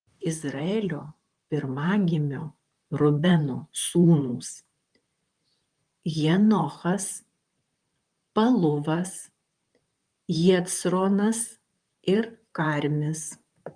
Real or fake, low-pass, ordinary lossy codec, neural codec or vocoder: fake; 9.9 kHz; Opus, 32 kbps; vocoder, 44.1 kHz, 128 mel bands, Pupu-Vocoder